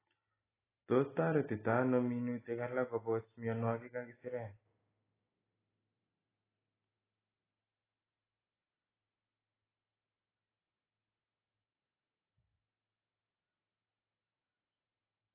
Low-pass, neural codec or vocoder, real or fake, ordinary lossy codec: 3.6 kHz; none; real; AAC, 16 kbps